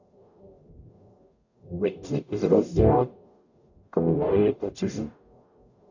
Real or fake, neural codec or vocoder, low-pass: fake; codec, 44.1 kHz, 0.9 kbps, DAC; 7.2 kHz